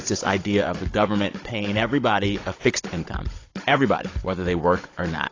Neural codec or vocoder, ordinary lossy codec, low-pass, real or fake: codec, 16 kHz, 16 kbps, FunCodec, trained on Chinese and English, 50 frames a second; AAC, 32 kbps; 7.2 kHz; fake